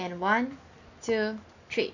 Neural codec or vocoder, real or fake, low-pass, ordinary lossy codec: none; real; 7.2 kHz; none